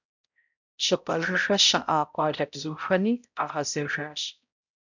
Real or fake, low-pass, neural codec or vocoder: fake; 7.2 kHz; codec, 16 kHz, 0.5 kbps, X-Codec, HuBERT features, trained on balanced general audio